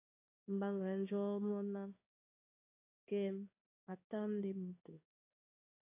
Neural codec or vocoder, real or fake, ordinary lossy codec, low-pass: codec, 16 kHz in and 24 kHz out, 1 kbps, XY-Tokenizer; fake; AAC, 32 kbps; 3.6 kHz